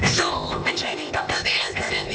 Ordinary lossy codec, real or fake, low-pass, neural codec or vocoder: none; fake; none; codec, 16 kHz, 0.8 kbps, ZipCodec